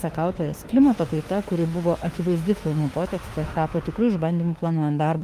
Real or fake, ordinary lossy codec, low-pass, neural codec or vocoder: fake; Opus, 32 kbps; 14.4 kHz; autoencoder, 48 kHz, 32 numbers a frame, DAC-VAE, trained on Japanese speech